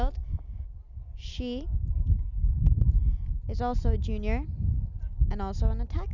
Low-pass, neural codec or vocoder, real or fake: 7.2 kHz; none; real